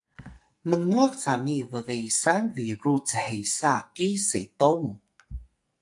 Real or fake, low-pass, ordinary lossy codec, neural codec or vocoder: fake; 10.8 kHz; AAC, 64 kbps; codec, 44.1 kHz, 2.6 kbps, SNAC